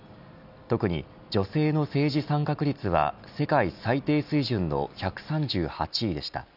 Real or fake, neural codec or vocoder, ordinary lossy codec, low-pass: real; none; none; 5.4 kHz